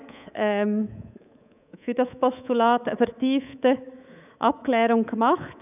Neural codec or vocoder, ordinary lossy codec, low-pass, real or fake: codec, 24 kHz, 3.1 kbps, DualCodec; none; 3.6 kHz; fake